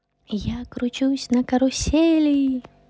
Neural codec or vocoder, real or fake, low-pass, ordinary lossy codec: none; real; none; none